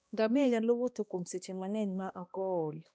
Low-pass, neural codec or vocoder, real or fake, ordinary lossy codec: none; codec, 16 kHz, 1 kbps, X-Codec, HuBERT features, trained on balanced general audio; fake; none